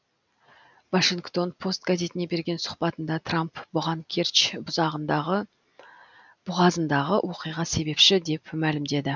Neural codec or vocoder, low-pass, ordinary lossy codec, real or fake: none; 7.2 kHz; none; real